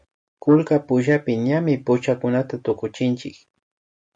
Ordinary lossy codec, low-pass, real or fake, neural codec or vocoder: MP3, 48 kbps; 9.9 kHz; real; none